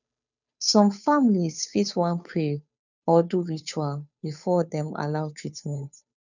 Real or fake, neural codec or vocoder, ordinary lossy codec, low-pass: fake; codec, 16 kHz, 2 kbps, FunCodec, trained on Chinese and English, 25 frames a second; none; 7.2 kHz